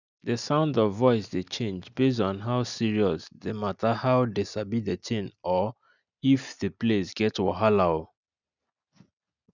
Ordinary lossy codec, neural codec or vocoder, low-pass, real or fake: none; none; 7.2 kHz; real